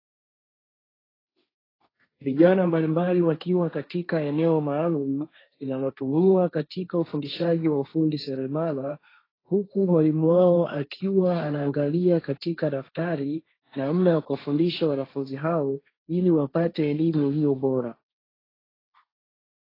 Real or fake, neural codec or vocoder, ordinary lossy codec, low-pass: fake; codec, 16 kHz, 1.1 kbps, Voila-Tokenizer; AAC, 24 kbps; 5.4 kHz